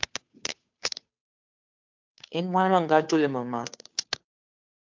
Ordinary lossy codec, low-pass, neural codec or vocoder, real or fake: MP3, 64 kbps; 7.2 kHz; codec, 16 kHz, 2 kbps, FunCodec, trained on Chinese and English, 25 frames a second; fake